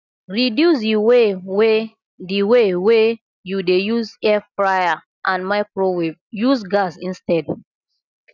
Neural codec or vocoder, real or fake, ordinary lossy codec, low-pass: none; real; none; 7.2 kHz